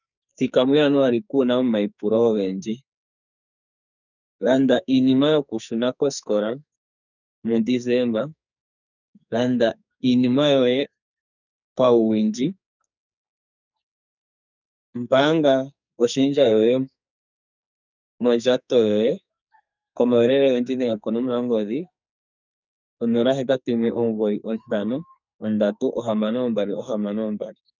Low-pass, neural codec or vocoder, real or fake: 7.2 kHz; codec, 44.1 kHz, 2.6 kbps, SNAC; fake